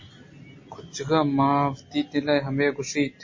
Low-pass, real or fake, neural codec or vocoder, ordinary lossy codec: 7.2 kHz; real; none; MP3, 32 kbps